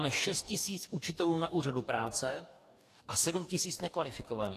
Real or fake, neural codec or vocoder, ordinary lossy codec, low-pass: fake; codec, 44.1 kHz, 2.6 kbps, DAC; AAC, 64 kbps; 14.4 kHz